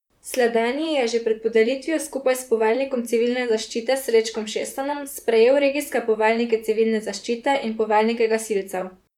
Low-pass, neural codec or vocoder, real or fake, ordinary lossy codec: 19.8 kHz; vocoder, 44.1 kHz, 128 mel bands, Pupu-Vocoder; fake; none